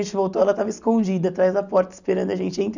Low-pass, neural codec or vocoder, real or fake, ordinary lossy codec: 7.2 kHz; none; real; none